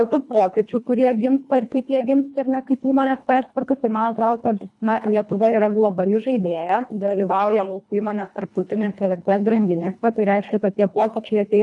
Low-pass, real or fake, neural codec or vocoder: 10.8 kHz; fake; codec, 24 kHz, 1.5 kbps, HILCodec